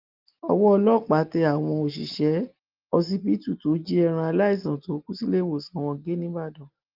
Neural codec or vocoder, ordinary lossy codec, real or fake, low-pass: none; Opus, 32 kbps; real; 5.4 kHz